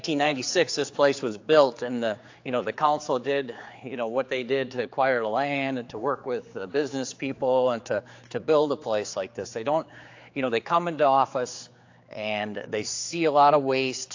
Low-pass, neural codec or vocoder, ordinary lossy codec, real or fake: 7.2 kHz; codec, 16 kHz, 4 kbps, X-Codec, HuBERT features, trained on general audio; AAC, 48 kbps; fake